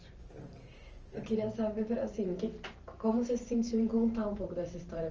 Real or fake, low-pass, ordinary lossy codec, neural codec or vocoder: fake; 7.2 kHz; Opus, 24 kbps; vocoder, 44.1 kHz, 128 mel bands every 512 samples, BigVGAN v2